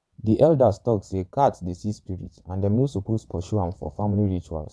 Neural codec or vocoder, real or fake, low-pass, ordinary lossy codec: vocoder, 22.05 kHz, 80 mel bands, WaveNeXt; fake; none; none